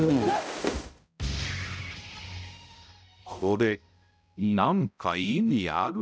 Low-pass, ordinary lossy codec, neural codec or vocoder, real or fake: none; none; codec, 16 kHz, 0.5 kbps, X-Codec, HuBERT features, trained on balanced general audio; fake